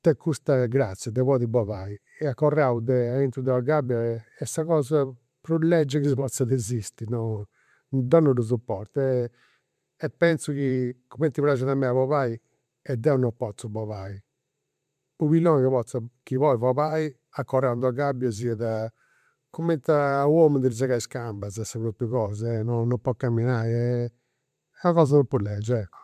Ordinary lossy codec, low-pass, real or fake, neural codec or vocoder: MP3, 96 kbps; 14.4 kHz; fake; vocoder, 44.1 kHz, 128 mel bands every 512 samples, BigVGAN v2